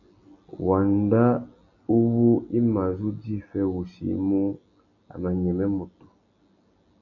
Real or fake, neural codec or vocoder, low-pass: real; none; 7.2 kHz